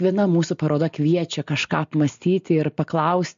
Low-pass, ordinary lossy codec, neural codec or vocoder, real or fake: 7.2 kHz; MP3, 48 kbps; none; real